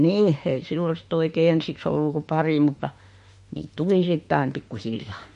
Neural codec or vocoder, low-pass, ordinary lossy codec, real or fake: autoencoder, 48 kHz, 32 numbers a frame, DAC-VAE, trained on Japanese speech; 14.4 kHz; MP3, 48 kbps; fake